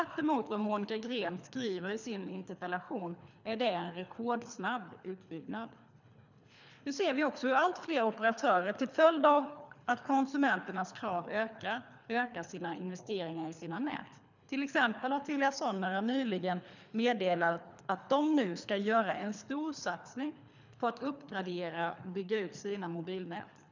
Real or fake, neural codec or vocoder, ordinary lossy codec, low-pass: fake; codec, 24 kHz, 3 kbps, HILCodec; none; 7.2 kHz